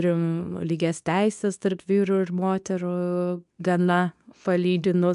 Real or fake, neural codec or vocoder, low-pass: fake; codec, 24 kHz, 0.9 kbps, WavTokenizer, medium speech release version 2; 10.8 kHz